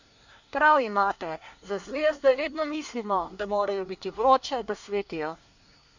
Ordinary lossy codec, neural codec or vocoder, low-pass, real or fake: none; codec, 24 kHz, 1 kbps, SNAC; 7.2 kHz; fake